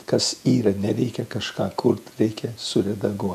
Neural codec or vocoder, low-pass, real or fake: vocoder, 48 kHz, 128 mel bands, Vocos; 14.4 kHz; fake